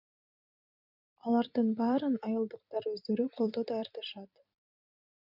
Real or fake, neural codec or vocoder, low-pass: fake; vocoder, 44.1 kHz, 128 mel bands every 256 samples, BigVGAN v2; 5.4 kHz